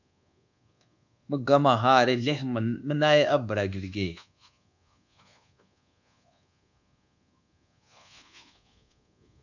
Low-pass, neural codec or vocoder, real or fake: 7.2 kHz; codec, 24 kHz, 1.2 kbps, DualCodec; fake